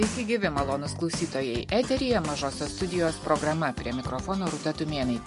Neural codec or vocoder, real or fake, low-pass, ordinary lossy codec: none; real; 14.4 kHz; MP3, 48 kbps